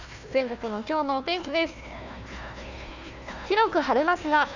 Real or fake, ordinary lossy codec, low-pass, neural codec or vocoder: fake; none; 7.2 kHz; codec, 16 kHz, 1 kbps, FunCodec, trained on Chinese and English, 50 frames a second